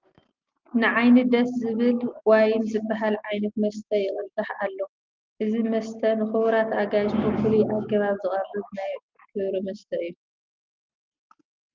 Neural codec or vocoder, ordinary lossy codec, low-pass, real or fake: none; Opus, 24 kbps; 7.2 kHz; real